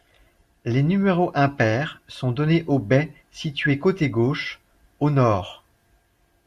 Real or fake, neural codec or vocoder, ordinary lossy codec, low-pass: real; none; Opus, 64 kbps; 14.4 kHz